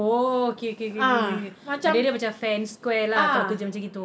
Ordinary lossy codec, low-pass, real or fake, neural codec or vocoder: none; none; real; none